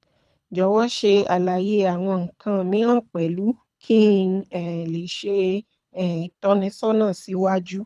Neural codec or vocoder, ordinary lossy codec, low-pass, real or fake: codec, 24 kHz, 3 kbps, HILCodec; none; none; fake